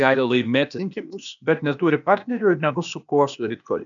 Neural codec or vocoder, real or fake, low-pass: codec, 16 kHz, 0.8 kbps, ZipCodec; fake; 7.2 kHz